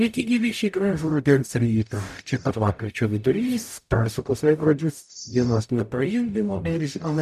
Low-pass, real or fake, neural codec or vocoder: 14.4 kHz; fake; codec, 44.1 kHz, 0.9 kbps, DAC